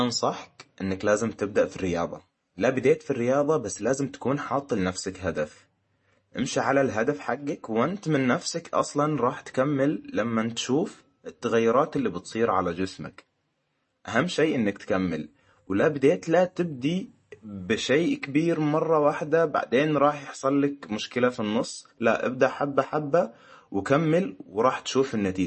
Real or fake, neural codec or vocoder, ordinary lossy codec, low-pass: real; none; MP3, 32 kbps; 10.8 kHz